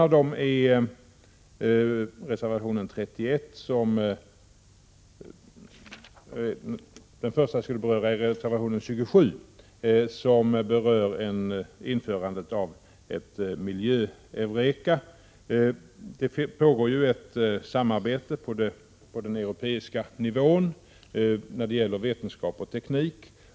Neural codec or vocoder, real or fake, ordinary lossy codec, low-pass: none; real; none; none